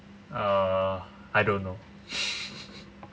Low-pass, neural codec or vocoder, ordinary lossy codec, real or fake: none; none; none; real